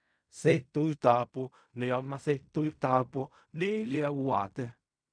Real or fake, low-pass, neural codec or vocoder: fake; 9.9 kHz; codec, 16 kHz in and 24 kHz out, 0.4 kbps, LongCat-Audio-Codec, fine tuned four codebook decoder